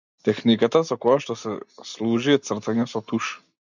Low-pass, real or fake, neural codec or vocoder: 7.2 kHz; real; none